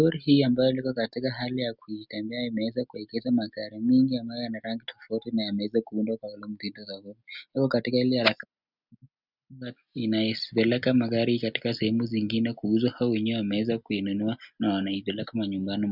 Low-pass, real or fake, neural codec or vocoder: 5.4 kHz; real; none